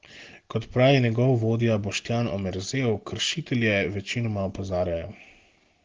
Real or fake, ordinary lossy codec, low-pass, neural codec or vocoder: real; Opus, 16 kbps; 7.2 kHz; none